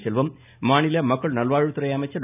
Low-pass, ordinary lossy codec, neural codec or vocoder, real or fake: 3.6 kHz; none; none; real